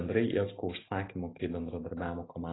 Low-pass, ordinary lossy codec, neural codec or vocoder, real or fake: 7.2 kHz; AAC, 16 kbps; none; real